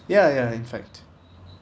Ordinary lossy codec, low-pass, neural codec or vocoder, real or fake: none; none; none; real